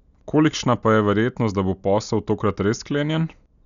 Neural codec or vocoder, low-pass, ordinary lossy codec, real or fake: none; 7.2 kHz; none; real